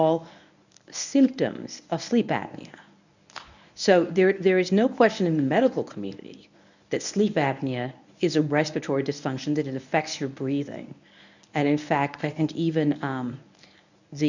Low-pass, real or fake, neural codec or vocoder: 7.2 kHz; fake; codec, 24 kHz, 0.9 kbps, WavTokenizer, medium speech release version 1